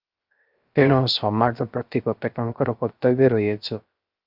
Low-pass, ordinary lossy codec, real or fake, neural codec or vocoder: 5.4 kHz; Opus, 24 kbps; fake; codec, 16 kHz, 0.3 kbps, FocalCodec